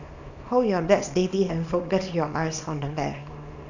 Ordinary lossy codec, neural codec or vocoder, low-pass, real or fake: none; codec, 24 kHz, 0.9 kbps, WavTokenizer, small release; 7.2 kHz; fake